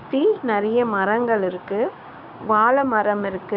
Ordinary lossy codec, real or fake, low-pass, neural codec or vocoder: none; fake; 5.4 kHz; codec, 16 kHz, 6 kbps, DAC